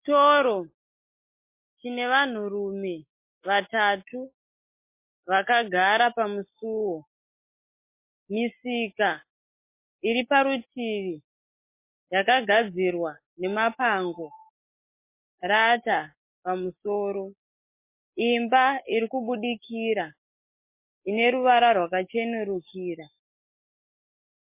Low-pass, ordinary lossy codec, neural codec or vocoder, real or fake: 3.6 kHz; MP3, 24 kbps; none; real